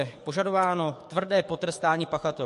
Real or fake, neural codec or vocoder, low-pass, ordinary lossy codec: fake; codec, 44.1 kHz, 7.8 kbps, DAC; 14.4 kHz; MP3, 48 kbps